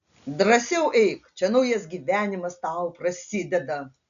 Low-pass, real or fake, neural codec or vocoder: 7.2 kHz; real; none